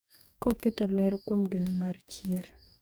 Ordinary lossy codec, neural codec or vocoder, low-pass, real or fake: none; codec, 44.1 kHz, 2.6 kbps, DAC; none; fake